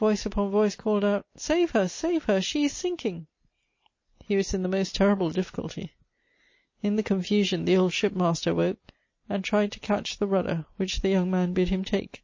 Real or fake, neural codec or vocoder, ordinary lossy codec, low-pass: real; none; MP3, 32 kbps; 7.2 kHz